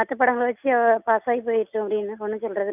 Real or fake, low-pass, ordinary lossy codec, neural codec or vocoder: real; 3.6 kHz; none; none